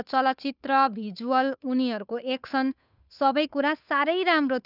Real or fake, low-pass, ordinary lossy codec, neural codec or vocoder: fake; 5.4 kHz; none; codec, 16 kHz, 8 kbps, FunCodec, trained on Chinese and English, 25 frames a second